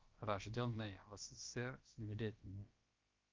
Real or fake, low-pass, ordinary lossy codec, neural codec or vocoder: fake; 7.2 kHz; Opus, 32 kbps; codec, 16 kHz, about 1 kbps, DyCAST, with the encoder's durations